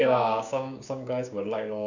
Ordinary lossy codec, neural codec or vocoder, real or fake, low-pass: none; codec, 16 kHz, 6 kbps, DAC; fake; 7.2 kHz